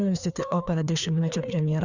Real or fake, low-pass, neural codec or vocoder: fake; 7.2 kHz; codec, 16 kHz, 2 kbps, FreqCodec, larger model